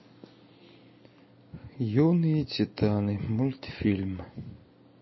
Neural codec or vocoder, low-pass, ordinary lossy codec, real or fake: none; 7.2 kHz; MP3, 24 kbps; real